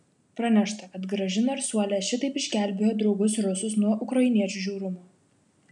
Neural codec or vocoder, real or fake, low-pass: none; real; 10.8 kHz